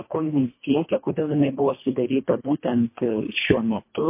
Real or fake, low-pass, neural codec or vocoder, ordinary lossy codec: fake; 3.6 kHz; codec, 24 kHz, 1.5 kbps, HILCodec; MP3, 24 kbps